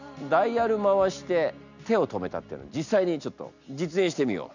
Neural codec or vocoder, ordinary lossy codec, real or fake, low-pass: none; none; real; 7.2 kHz